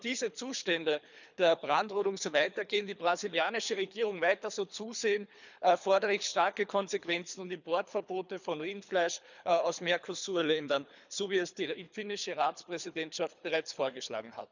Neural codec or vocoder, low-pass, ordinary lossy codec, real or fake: codec, 24 kHz, 3 kbps, HILCodec; 7.2 kHz; none; fake